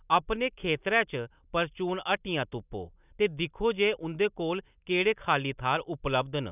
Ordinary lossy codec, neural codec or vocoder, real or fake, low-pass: none; none; real; 3.6 kHz